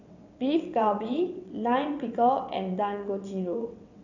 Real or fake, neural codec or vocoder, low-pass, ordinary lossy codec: fake; vocoder, 22.05 kHz, 80 mel bands, WaveNeXt; 7.2 kHz; none